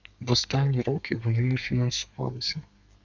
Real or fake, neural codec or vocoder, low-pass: fake; codec, 32 kHz, 1.9 kbps, SNAC; 7.2 kHz